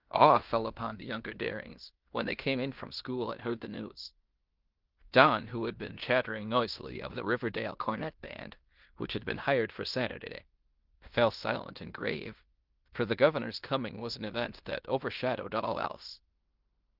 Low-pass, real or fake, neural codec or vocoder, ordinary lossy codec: 5.4 kHz; fake; codec, 16 kHz in and 24 kHz out, 0.9 kbps, LongCat-Audio-Codec, fine tuned four codebook decoder; Opus, 32 kbps